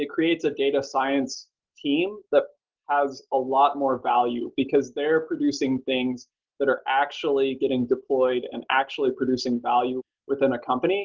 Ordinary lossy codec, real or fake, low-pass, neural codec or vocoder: Opus, 16 kbps; real; 7.2 kHz; none